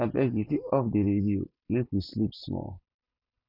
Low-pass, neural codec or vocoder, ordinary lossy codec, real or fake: 5.4 kHz; none; none; real